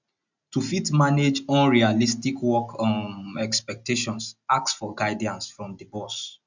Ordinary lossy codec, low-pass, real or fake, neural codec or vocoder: none; 7.2 kHz; real; none